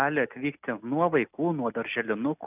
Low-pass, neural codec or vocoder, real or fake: 3.6 kHz; none; real